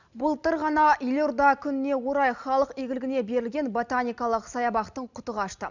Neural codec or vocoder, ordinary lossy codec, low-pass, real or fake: none; none; 7.2 kHz; real